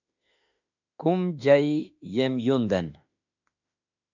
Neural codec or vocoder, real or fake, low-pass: autoencoder, 48 kHz, 32 numbers a frame, DAC-VAE, trained on Japanese speech; fake; 7.2 kHz